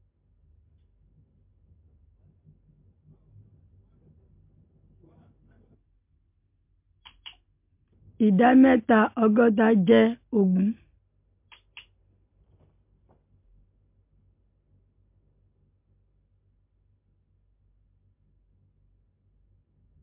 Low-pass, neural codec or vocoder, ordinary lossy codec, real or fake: 3.6 kHz; none; MP3, 32 kbps; real